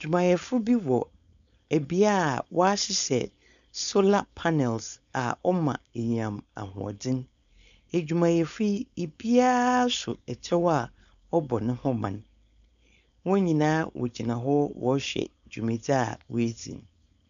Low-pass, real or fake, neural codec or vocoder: 7.2 kHz; fake; codec, 16 kHz, 4.8 kbps, FACodec